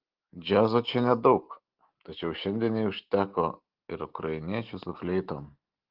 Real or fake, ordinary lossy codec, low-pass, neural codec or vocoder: real; Opus, 16 kbps; 5.4 kHz; none